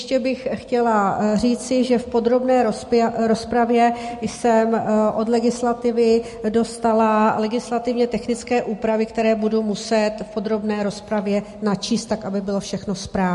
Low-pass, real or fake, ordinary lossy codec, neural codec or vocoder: 14.4 kHz; real; MP3, 48 kbps; none